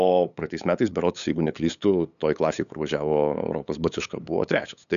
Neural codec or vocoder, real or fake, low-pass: codec, 16 kHz, 8 kbps, FunCodec, trained on LibriTTS, 25 frames a second; fake; 7.2 kHz